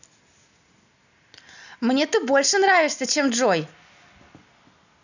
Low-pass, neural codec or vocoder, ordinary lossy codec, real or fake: 7.2 kHz; none; none; real